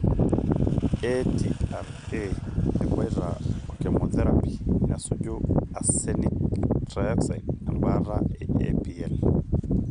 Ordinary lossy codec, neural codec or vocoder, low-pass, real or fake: none; none; 9.9 kHz; real